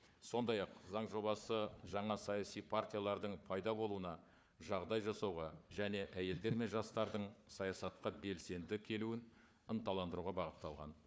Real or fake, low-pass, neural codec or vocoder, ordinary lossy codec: fake; none; codec, 16 kHz, 4 kbps, FunCodec, trained on Chinese and English, 50 frames a second; none